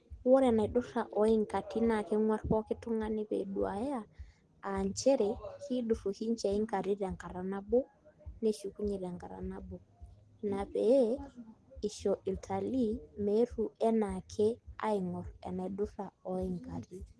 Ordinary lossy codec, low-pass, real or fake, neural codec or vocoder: Opus, 16 kbps; 10.8 kHz; real; none